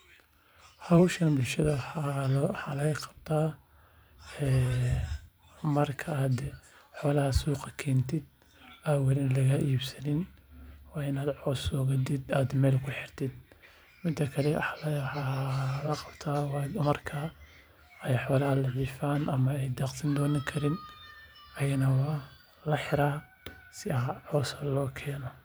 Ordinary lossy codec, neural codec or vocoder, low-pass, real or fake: none; vocoder, 44.1 kHz, 128 mel bands every 512 samples, BigVGAN v2; none; fake